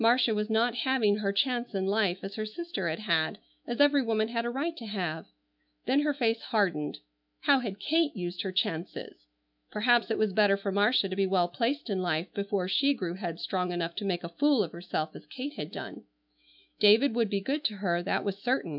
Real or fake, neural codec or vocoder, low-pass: fake; autoencoder, 48 kHz, 128 numbers a frame, DAC-VAE, trained on Japanese speech; 5.4 kHz